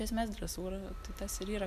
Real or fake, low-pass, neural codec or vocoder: real; 14.4 kHz; none